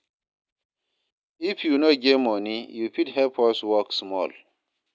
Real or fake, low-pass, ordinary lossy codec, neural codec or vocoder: real; none; none; none